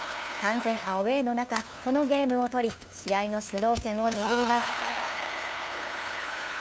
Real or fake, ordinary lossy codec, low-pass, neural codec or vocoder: fake; none; none; codec, 16 kHz, 2 kbps, FunCodec, trained on LibriTTS, 25 frames a second